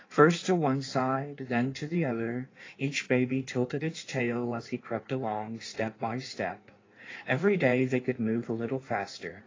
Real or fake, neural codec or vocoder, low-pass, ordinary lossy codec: fake; codec, 16 kHz in and 24 kHz out, 1.1 kbps, FireRedTTS-2 codec; 7.2 kHz; AAC, 32 kbps